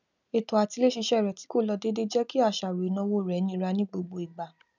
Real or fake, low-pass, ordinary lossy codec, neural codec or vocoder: real; 7.2 kHz; none; none